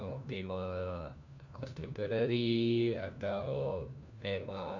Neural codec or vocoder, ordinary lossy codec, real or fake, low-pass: codec, 16 kHz, 1 kbps, FunCodec, trained on LibriTTS, 50 frames a second; none; fake; 7.2 kHz